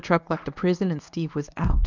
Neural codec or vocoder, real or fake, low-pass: codec, 24 kHz, 0.9 kbps, WavTokenizer, medium speech release version 1; fake; 7.2 kHz